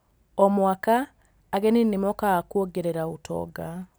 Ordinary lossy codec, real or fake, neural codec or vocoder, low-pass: none; real; none; none